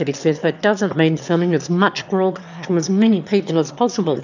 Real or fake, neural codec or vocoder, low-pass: fake; autoencoder, 22.05 kHz, a latent of 192 numbers a frame, VITS, trained on one speaker; 7.2 kHz